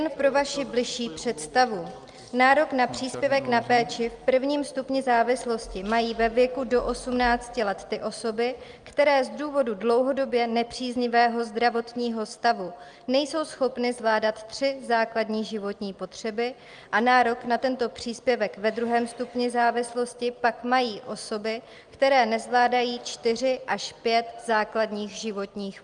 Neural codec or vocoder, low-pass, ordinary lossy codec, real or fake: none; 9.9 kHz; Opus, 32 kbps; real